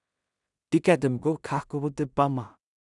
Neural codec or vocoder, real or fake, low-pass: codec, 16 kHz in and 24 kHz out, 0.4 kbps, LongCat-Audio-Codec, two codebook decoder; fake; 10.8 kHz